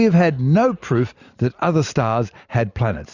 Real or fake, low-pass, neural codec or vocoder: real; 7.2 kHz; none